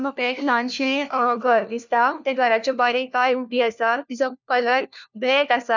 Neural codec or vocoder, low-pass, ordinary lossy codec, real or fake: codec, 16 kHz, 1 kbps, FunCodec, trained on LibriTTS, 50 frames a second; 7.2 kHz; none; fake